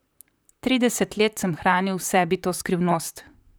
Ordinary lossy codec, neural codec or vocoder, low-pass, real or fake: none; vocoder, 44.1 kHz, 128 mel bands, Pupu-Vocoder; none; fake